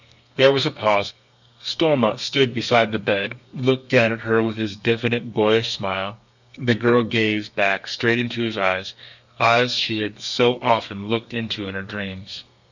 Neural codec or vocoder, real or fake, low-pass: codec, 32 kHz, 1.9 kbps, SNAC; fake; 7.2 kHz